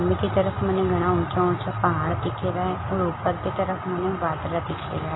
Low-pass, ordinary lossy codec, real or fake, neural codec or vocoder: 7.2 kHz; AAC, 16 kbps; real; none